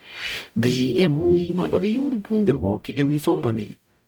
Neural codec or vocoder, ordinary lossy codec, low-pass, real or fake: codec, 44.1 kHz, 0.9 kbps, DAC; none; 19.8 kHz; fake